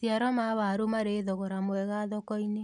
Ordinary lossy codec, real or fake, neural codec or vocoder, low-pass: none; real; none; 10.8 kHz